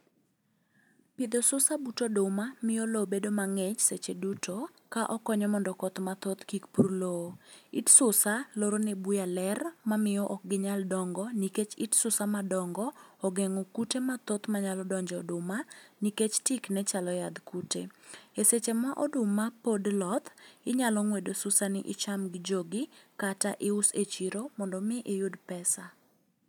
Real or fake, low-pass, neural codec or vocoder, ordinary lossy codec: real; none; none; none